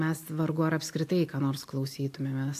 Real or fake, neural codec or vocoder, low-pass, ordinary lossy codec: real; none; 14.4 kHz; AAC, 64 kbps